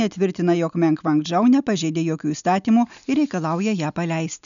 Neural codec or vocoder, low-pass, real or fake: none; 7.2 kHz; real